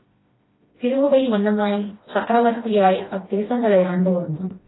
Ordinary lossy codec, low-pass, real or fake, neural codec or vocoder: AAC, 16 kbps; 7.2 kHz; fake; codec, 16 kHz, 1 kbps, FreqCodec, smaller model